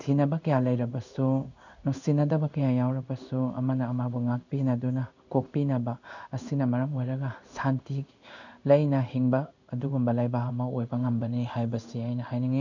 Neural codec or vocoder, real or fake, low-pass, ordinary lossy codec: codec, 16 kHz in and 24 kHz out, 1 kbps, XY-Tokenizer; fake; 7.2 kHz; none